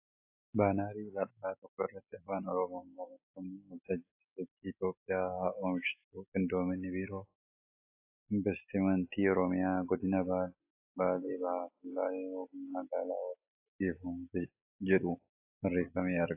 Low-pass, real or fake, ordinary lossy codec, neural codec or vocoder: 3.6 kHz; real; AAC, 32 kbps; none